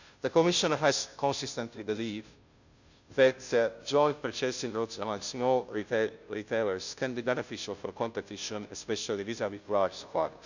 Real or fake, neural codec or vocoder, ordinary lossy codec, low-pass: fake; codec, 16 kHz, 0.5 kbps, FunCodec, trained on Chinese and English, 25 frames a second; none; 7.2 kHz